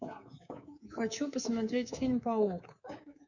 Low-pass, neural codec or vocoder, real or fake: 7.2 kHz; codec, 24 kHz, 3.1 kbps, DualCodec; fake